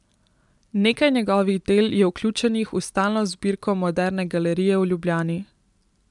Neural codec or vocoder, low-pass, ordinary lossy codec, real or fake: none; 10.8 kHz; none; real